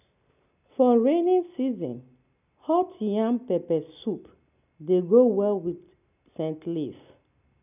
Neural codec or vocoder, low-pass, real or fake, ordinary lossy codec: none; 3.6 kHz; real; none